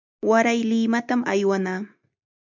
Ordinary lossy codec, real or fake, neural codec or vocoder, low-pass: MP3, 64 kbps; real; none; 7.2 kHz